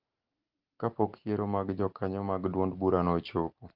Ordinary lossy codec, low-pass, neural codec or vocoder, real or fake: Opus, 16 kbps; 5.4 kHz; none; real